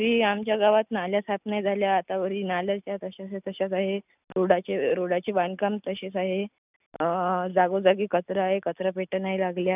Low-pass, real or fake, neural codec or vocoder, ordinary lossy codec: 3.6 kHz; real; none; none